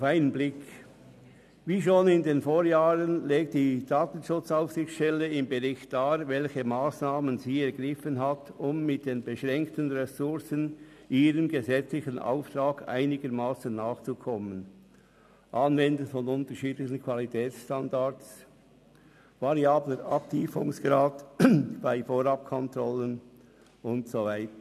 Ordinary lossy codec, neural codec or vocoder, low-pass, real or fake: none; none; 14.4 kHz; real